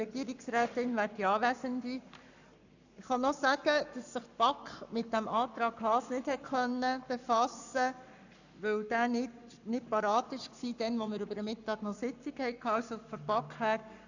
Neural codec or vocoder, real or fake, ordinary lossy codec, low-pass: codec, 44.1 kHz, 7.8 kbps, Pupu-Codec; fake; none; 7.2 kHz